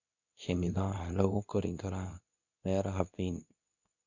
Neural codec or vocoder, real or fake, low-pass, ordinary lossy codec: codec, 24 kHz, 0.9 kbps, WavTokenizer, medium speech release version 2; fake; 7.2 kHz; none